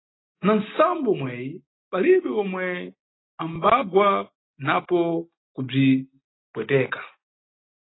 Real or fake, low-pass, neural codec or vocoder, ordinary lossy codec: real; 7.2 kHz; none; AAC, 16 kbps